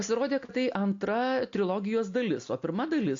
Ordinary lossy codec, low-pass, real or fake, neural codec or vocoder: AAC, 48 kbps; 7.2 kHz; real; none